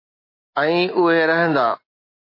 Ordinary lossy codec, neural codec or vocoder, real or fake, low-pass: MP3, 32 kbps; none; real; 5.4 kHz